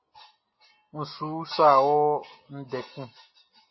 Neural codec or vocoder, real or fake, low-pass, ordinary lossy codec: none; real; 7.2 kHz; MP3, 24 kbps